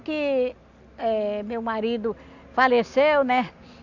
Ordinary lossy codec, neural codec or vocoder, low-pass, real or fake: none; none; 7.2 kHz; real